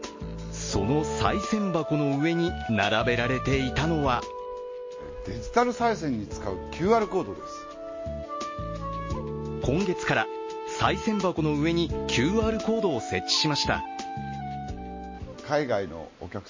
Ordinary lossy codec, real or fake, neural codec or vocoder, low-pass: MP3, 32 kbps; real; none; 7.2 kHz